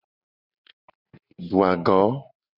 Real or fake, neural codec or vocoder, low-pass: real; none; 5.4 kHz